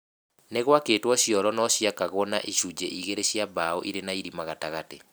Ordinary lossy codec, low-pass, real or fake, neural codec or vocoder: none; none; real; none